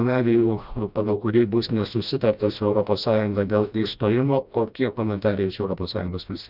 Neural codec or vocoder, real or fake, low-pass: codec, 16 kHz, 1 kbps, FreqCodec, smaller model; fake; 5.4 kHz